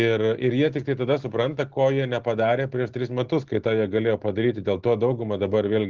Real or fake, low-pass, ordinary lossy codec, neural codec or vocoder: real; 7.2 kHz; Opus, 16 kbps; none